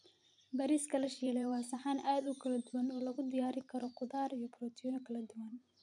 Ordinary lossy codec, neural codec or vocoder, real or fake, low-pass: none; vocoder, 44.1 kHz, 128 mel bands every 512 samples, BigVGAN v2; fake; 10.8 kHz